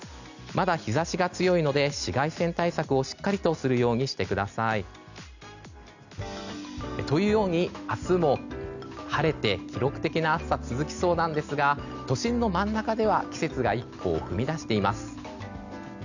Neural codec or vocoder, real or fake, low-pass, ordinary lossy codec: none; real; 7.2 kHz; none